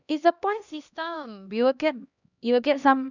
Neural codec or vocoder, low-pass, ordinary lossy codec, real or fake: codec, 16 kHz, 1 kbps, X-Codec, HuBERT features, trained on LibriSpeech; 7.2 kHz; none; fake